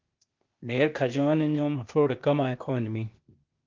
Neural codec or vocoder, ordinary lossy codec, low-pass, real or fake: codec, 16 kHz, 0.8 kbps, ZipCodec; Opus, 32 kbps; 7.2 kHz; fake